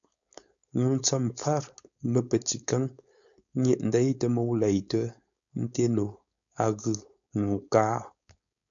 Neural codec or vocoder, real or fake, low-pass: codec, 16 kHz, 4.8 kbps, FACodec; fake; 7.2 kHz